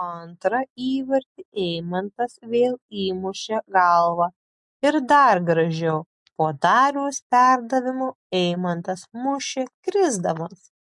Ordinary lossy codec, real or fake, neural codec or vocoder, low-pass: MP3, 64 kbps; real; none; 10.8 kHz